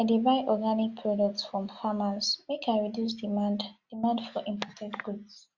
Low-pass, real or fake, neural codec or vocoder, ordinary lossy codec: 7.2 kHz; real; none; Opus, 64 kbps